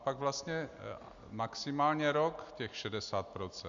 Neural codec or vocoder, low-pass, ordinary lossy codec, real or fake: none; 7.2 kHz; Opus, 64 kbps; real